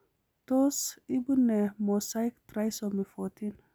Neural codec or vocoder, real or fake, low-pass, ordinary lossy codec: none; real; none; none